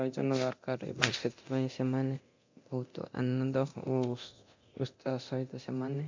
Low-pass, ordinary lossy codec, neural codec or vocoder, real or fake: 7.2 kHz; MP3, 64 kbps; codec, 24 kHz, 0.9 kbps, DualCodec; fake